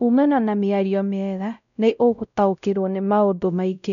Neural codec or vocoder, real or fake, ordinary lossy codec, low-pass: codec, 16 kHz, 0.5 kbps, X-Codec, WavLM features, trained on Multilingual LibriSpeech; fake; none; 7.2 kHz